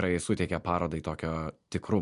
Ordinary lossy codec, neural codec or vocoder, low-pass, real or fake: MP3, 64 kbps; none; 10.8 kHz; real